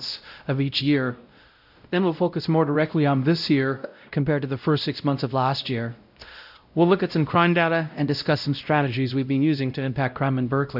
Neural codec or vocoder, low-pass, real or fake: codec, 16 kHz, 0.5 kbps, X-Codec, WavLM features, trained on Multilingual LibriSpeech; 5.4 kHz; fake